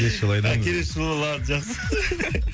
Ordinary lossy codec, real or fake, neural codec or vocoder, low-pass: none; real; none; none